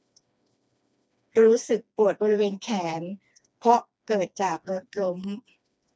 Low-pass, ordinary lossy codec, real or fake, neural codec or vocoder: none; none; fake; codec, 16 kHz, 2 kbps, FreqCodec, smaller model